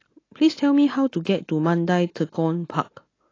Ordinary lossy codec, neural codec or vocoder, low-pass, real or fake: AAC, 32 kbps; none; 7.2 kHz; real